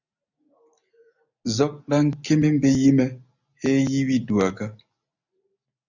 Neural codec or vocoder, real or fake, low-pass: none; real; 7.2 kHz